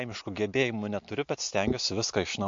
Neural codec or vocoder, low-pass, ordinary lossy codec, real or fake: none; 7.2 kHz; MP3, 48 kbps; real